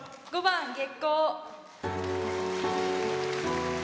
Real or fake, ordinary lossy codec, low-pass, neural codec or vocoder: real; none; none; none